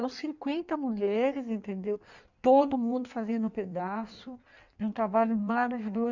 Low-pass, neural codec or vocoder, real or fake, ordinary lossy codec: 7.2 kHz; codec, 16 kHz in and 24 kHz out, 1.1 kbps, FireRedTTS-2 codec; fake; none